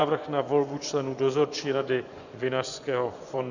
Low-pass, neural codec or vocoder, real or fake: 7.2 kHz; vocoder, 24 kHz, 100 mel bands, Vocos; fake